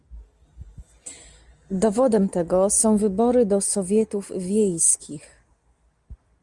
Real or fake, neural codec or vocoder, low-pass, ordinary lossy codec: real; none; 10.8 kHz; Opus, 32 kbps